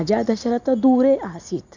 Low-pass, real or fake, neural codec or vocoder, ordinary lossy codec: 7.2 kHz; real; none; none